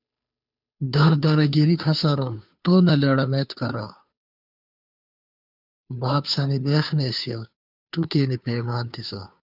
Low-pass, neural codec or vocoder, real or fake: 5.4 kHz; codec, 16 kHz, 2 kbps, FunCodec, trained on Chinese and English, 25 frames a second; fake